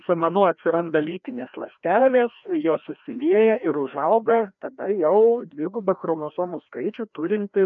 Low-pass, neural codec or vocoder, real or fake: 7.2 kHz; codec, 16 kHz, 1 kbps, FreqCodec, larger model; fake